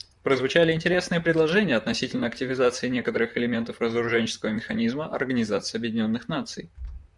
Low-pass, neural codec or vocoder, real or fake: 10.8 kHz; vocoder, 44.1 kHz, 128 mel bands, Pupu-Vocoder; fake